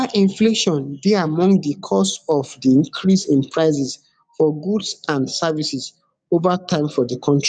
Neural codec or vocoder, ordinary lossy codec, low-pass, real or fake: codec, 44.1 kHz, 7.8 kbps, DAC; none; 9.9 kHz; fake